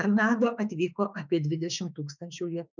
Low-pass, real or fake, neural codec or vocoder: 7.2 kHz; fake; autoencoder, 48 kHz, 32 numbers a frame, DAC-VAE, trained on Japanese speech